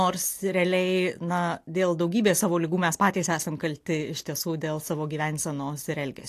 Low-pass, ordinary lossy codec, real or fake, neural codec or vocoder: 14.4 kHz; AAC, 64 kbps; real; none